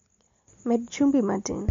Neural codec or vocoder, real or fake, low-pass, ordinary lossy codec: none; real; 7.2 kHz; MP3, 48 kbps